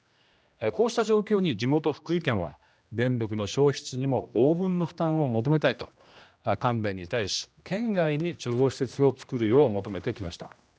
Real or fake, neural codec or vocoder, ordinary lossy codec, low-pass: fake; codec, 16 kHz, 1 kbps, X-Codec, HuBERT features, trained on general audio; none; none